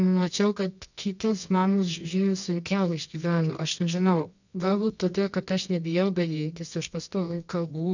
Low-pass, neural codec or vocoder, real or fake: 7.2 kHz; codec, 24 kHz, 0.9 kbps, WavTokenizer, medium music audio release; fake